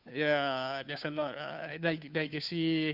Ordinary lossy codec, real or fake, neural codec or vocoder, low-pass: none; fake; codec, 16 kHz, 2 kbps, FunCodec, trained on Chinese and English, 25 frames a second; 5.4 kHz